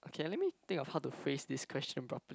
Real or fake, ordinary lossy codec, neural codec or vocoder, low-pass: real; none; none; none